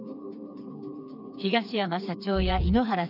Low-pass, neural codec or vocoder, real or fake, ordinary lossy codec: 5.4 kHz; codec, 16 kHz, 4 kbps, FreqCodec, smaller model; fake; none